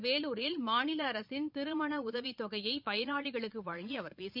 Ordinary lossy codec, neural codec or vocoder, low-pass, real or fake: none; vocoder, 22.05 kHz, 80 mel bands, Vocos; 5.4 kHz; fake